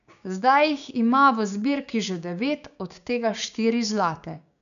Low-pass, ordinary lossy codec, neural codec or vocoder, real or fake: 7.2 kHz; none; codec, 16 kHz, 6 kbps, DAC; fake